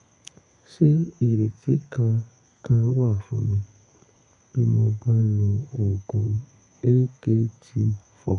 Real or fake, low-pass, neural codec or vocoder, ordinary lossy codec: fake; 10.8 kHz; codec, 32 kHz, 1.9 kbps, SNAC; none